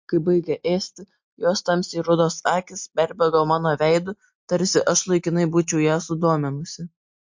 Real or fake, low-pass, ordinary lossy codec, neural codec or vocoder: real; 7.2 kHz; MP3, 48 kbps; none